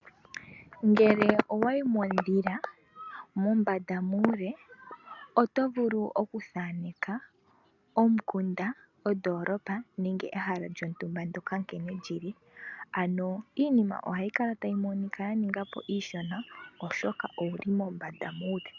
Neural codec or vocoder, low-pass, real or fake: none; 7.2 kHz; real